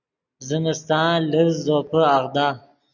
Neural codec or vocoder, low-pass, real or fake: none; 7.2 kHz; real